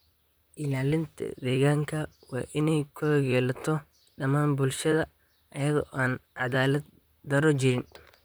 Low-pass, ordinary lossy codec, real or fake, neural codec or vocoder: none; none; fake; vocoder, 44.1 kHz, 128 mel bands, Pupu-Vocoder